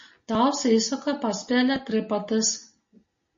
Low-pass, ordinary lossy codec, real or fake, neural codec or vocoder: 7.2 kHz; MP3, 32 kbps; real; none